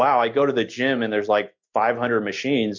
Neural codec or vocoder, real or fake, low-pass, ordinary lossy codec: none; real; 7.2 kHz; MP3, 48 kbps